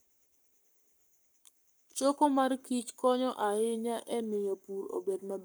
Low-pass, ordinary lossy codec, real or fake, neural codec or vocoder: none; none; fake; codec, 44.1 kHz, 7.8 kbps, Pupu-Codec